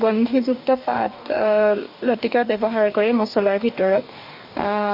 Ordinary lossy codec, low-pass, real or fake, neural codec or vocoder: MP3, 48 kbps; 5.4 kHz; fake; codec, 44.1 kHz, 2.6 kbps, DAC